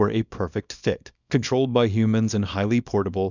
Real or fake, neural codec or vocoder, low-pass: fake; codec, 24 kHz, 0.9 kbps, WavTokenizer, small release; 7.2 kHz